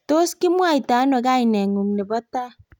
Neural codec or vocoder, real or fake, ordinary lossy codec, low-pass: none; real; none; 19.8 kHz